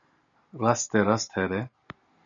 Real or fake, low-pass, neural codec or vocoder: real; 7.2 kHz; none